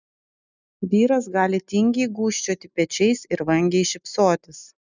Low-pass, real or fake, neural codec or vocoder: 7.2 kHz; real; none